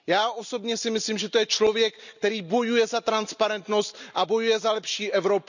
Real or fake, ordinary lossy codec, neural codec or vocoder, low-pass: real; none; none; 7.2 kHz